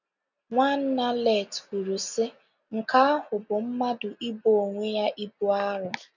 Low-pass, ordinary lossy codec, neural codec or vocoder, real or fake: 7.2 kHz; none; none; real